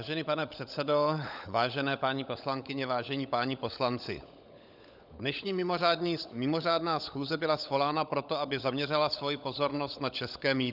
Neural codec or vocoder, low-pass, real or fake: codec, 16 kHz, 16 kbps, FunCodec, trained on LibriTTS, 50 frames a second; 5.4 kHz; fake